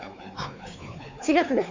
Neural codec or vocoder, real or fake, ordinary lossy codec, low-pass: codec, 16 kHz, 4 kbps, X-Codec, WavLM features, trained on Multilingual LibriSpeech; fake; MP3, 64 kbps; 7.2 kHz